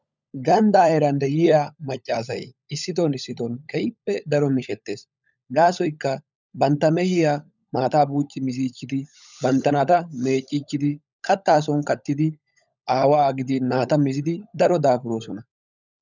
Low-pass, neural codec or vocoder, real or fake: 7.2 kHz; codec, 16 kHz, 16 kbps, FunCodec, trained on LibriTTS, 50 frames a second; fake